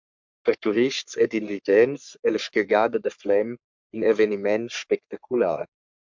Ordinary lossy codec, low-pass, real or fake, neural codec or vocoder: MP3, 64 kbps; 7.2 kHz; fake; codec, 44.1 kHz, 3.4 kbps, Pupu-Codec